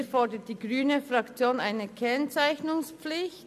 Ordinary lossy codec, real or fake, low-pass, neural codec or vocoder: none; real; 14.4 kHz; none